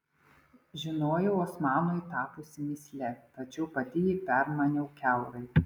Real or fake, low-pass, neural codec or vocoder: real; 19.8 kHz; none